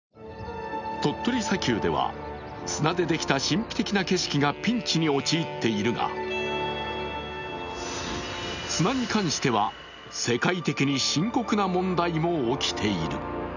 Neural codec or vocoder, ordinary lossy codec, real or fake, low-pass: none; none; real; 7.2 kHz